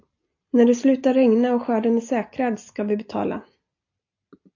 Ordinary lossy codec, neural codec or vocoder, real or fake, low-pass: AAC, 48 kbps; none; real; 7.2 kHz